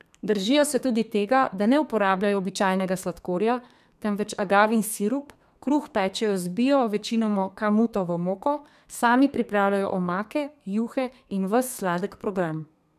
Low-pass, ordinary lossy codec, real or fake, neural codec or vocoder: 14.4 kHz; none; fake; codec, 32 kHz, 1.9 kbps, SNAC